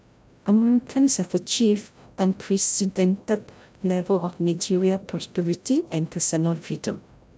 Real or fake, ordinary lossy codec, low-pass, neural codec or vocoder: fake; none; none; codec, 16 kHz, 0.5 kbps, FreqCodec, larger model